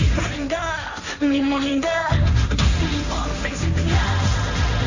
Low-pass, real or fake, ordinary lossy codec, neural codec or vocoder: 7.2 kHz; fake; none; codec, 16 kHz, 1.1 kbps, Voila-Tokenizer